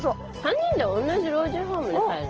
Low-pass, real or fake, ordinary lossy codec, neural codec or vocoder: 7.2 kHz; real; Opus, 16 kbps; none